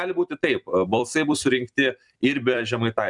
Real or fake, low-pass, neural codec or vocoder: fake; 10.8 kHz; vocoder, 44.1 kHz, 128 mel bands every 256 samples, BigVGAN v2